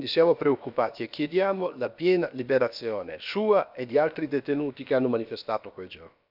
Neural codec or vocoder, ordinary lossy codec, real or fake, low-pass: codec, 16 kHz, about 1 kbps, DyCAST, with the encoder's durations; none; fake; 5.4 kHz